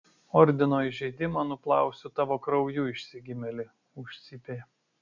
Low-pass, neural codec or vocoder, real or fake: 7.2 kHz; none; real